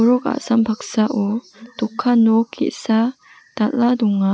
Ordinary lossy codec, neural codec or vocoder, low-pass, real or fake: none; none; none; real